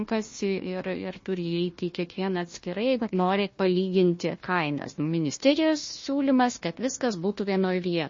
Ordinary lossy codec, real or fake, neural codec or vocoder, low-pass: MP3, 32 kbps; fake; codec, 16 kHz, 1 kbps, FunCodec, trained on Chinese and English, 50 frames a second; 7.2 kHz